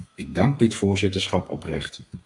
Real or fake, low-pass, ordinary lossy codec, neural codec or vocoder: fake; 10.8 kHz; AAC, 64 kbps; codec, 32 kHz, 1.9 kbps, SNAC